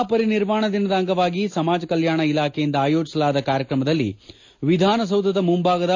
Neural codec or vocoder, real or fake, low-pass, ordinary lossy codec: none; real; 7.2 kHz; AAC, 48 kbps